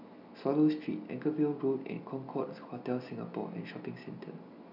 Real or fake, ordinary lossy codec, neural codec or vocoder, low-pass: real; none; none; 5.4 kHz